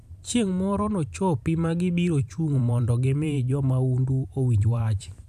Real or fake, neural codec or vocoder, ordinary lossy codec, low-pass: fake; vocoder, 44.1 kHz, 128 mel bands every 512 samples, BigVGAN v2; none; 14.4 kHz